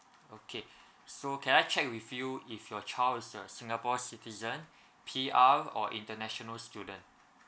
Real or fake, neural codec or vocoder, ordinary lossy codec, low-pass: real; none; none; none